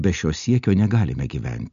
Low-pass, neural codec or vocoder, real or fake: 7.2 kHz; none; real